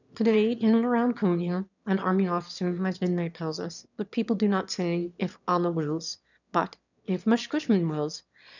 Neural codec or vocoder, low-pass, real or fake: autoencoder, 22.05 kHz, a latent of 192 numbers a frame, VITS, trained on one speaker; 7.2 kHz; fake